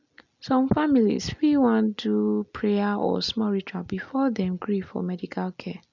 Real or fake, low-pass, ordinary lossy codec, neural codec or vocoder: real; 7.2 kHz; none; none